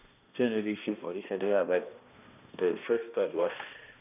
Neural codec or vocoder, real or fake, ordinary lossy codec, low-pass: codec, 16 kHz, 1 kbps, X-Codec, HuBERT features, trained on balanced general audio; fake; none; 3.6 kHz